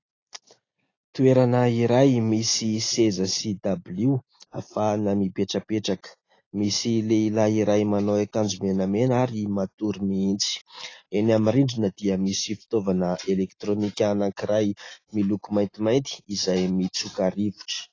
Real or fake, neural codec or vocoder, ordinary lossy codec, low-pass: real; none; AAC, 32 kbps; 7.2 kHz